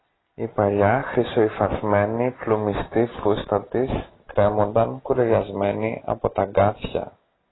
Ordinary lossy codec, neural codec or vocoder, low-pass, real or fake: AAC, 16 kbps; none; 7.2 kHz; real